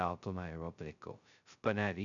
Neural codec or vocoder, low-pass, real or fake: codec, 16 kHz, 0.2 kbps, FocalCodec; 7.2 kHz; fake